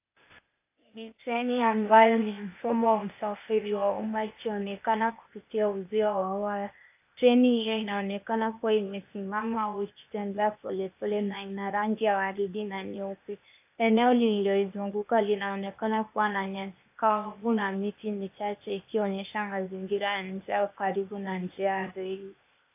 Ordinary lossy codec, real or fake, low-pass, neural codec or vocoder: AAC, 32 kbps; fake; 3.6 kHz; codec, 16 kHz, 0.8 kbps, ZipCodec